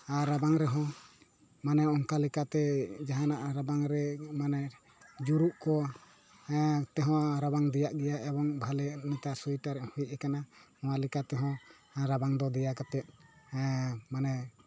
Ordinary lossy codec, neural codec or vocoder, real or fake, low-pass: none; none; real; none